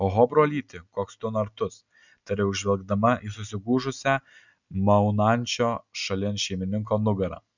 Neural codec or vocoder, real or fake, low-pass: none; real; 7.2 kHz